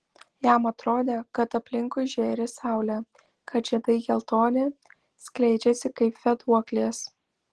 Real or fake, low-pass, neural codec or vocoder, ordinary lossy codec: real; 10.8 kHz; none; Opus, 16 kbps